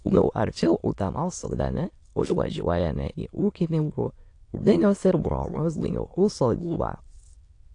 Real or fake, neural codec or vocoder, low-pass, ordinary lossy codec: fake; autoencoder, 22.05 kHz, a latent of 192 numbers a frame, VITS, trained on many speakers; 9.9 kHz; AAC, 48 kbps